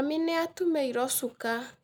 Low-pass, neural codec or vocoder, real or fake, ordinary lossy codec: none; none; real; none